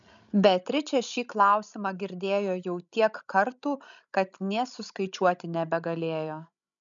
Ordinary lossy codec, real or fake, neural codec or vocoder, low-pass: MP3, 96 kbps; fake; codec, 16 kHz, 16 kbps, FreqCodec, larger model; 7.2 kHz